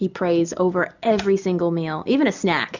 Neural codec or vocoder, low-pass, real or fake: none; 7.2 kHz; real